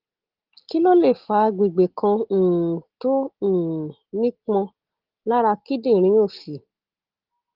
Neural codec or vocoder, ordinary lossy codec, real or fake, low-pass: none; Opus, 16 kbps; real; 5.4 kHz